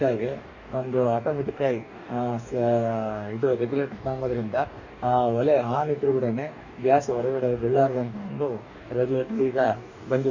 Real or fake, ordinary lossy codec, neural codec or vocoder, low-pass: fake; none; codec, 44.1 kHz, 2.6 kbps, DAC; 7.2 kHz